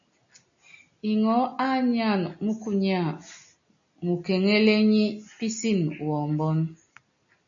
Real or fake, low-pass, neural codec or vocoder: real; 7.2 kHz; none